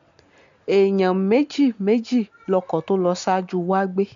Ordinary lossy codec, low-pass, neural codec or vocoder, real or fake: MP3, 64 kbps; 7.2 kHz; none; real